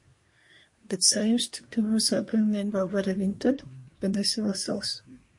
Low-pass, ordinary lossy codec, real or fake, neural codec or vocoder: 10.8 kHz; MP3, 48 kbps; fake; codec, 24 kHz, 1 kbps, SNAC